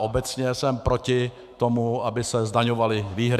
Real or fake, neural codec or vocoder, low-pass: fake; autoencoder, 48 kHz, 128 numbers a frame, DAC-VAE, trained on Japanese speech; 14.4 kHz